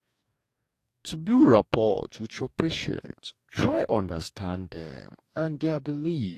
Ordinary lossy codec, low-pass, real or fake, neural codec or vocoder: AAC, 64 kbps; 14.4 kHz; fake; codec, 44.1 kHz, 2.6 kbps, DAC